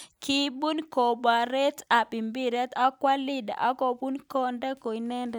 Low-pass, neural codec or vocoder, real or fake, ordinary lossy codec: none; none; real; none